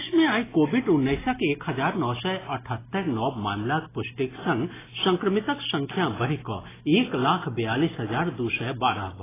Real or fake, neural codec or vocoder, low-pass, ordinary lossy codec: real; none; 3.6 kHz; AAC, 16 kbps